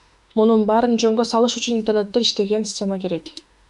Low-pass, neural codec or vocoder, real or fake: 10.8 kHz; autoencoder, 48 kHz, 32 numbers a frame, DAC-VAE, trained on Japanese speech; fake